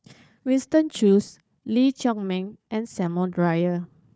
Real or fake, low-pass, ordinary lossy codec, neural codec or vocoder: fake; none; none; codec, 16 kHz, 4 kbps, FunCodec, trained on Chinese and English, 50 frames a second